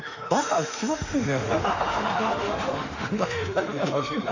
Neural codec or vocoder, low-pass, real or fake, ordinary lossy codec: autoencoder, 48 kHz, 32 numbers a frame, DAC-VAE, trained on Japanese speech; 7.2 kHz; fake; none